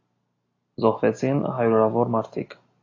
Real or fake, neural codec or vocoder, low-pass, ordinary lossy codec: real; none; 7.2 kHz; AAC, 32 kbps